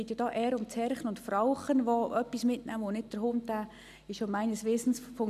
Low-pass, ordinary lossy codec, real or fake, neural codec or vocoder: 14.4 kHz; none; real; none